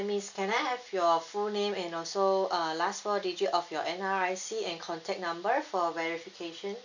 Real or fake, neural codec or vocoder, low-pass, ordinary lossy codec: real; none; 7.2 kHz; none